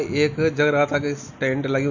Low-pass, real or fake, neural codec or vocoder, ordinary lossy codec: 7.2 kHz; real; none; none